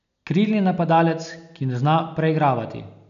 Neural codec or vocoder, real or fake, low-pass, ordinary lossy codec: none; real; 7.2 kHz; none